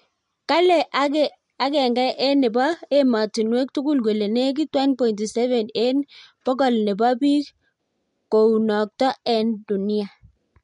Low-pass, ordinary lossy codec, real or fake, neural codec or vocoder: 10.8 kHz; MP3, 64 kbps; real; none